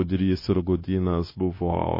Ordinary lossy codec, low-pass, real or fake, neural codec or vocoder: MP3, 24 kbps; 5.4 kHz; fake; codec, 16 kHz, 0.9 kbps, LongCat-Audio-Codec